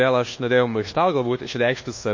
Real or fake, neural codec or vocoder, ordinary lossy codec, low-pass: fake; codec, 24 kHz, 1.2 kbps, DualCodec; MP3, 32 kbps; 7.2 kHz